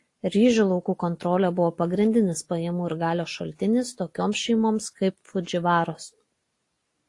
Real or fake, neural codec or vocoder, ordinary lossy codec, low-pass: real; none; AAC, 48 kbps; 10.8 kHz